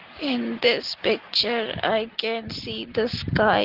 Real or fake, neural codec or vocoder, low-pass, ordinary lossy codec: real; none; 5.4 kHz; Opus, 16 kbps